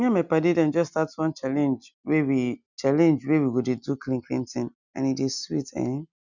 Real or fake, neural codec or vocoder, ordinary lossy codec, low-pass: real; none; none; 7.2 kHz